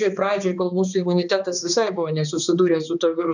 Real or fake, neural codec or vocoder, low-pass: fake; codec, 16 kHz, 2 kbps, X-Codec, HuBERT features, trained on balanced general audio; 7.2 kHz